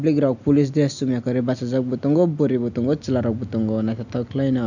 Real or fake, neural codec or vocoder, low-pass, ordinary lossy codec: real; none; 7.2 kHz; none